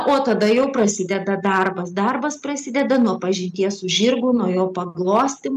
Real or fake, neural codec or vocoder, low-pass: real; none; 14.4 kHz